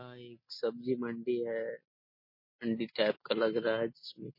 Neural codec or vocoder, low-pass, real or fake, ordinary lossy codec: none; 5.4 kHz; real; MP3, 24 kbps